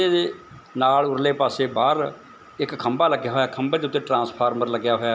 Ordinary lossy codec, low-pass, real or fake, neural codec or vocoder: none; none; real; none